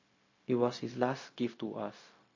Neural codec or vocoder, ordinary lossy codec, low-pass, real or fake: codec, 16 kHz, 0.4 kbps, LongCat-Audio-Codec; MP3, 32 kbps; 7.2 kHz; fake